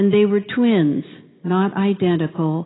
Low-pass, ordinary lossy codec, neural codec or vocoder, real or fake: 7.2 kHz; AAC, 16 kbps; none; real